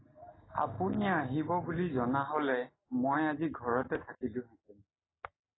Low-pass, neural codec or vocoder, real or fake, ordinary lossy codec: 7.2 kHz; none; real; AAC, 16 kbps